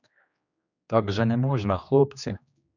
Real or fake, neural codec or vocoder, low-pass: fake; codec, 16 kHz, 2 kbps, X-Codec, HuBERT features, trained on general audio; 7.2 kHz